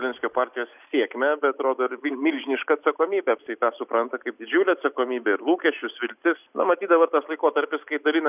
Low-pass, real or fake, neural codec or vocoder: 3.6 kHz; real; none